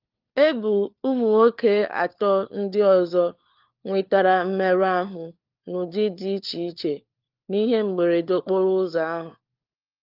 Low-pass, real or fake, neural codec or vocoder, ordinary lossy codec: 5.4 kHz; fake; codec, 16 kHz, 4 kbps, FunCodec, trained on LibriTTS, 50 frames a second; Opus, 32 kbps